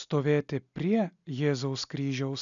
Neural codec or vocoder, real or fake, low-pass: none; real; 7.2 kHz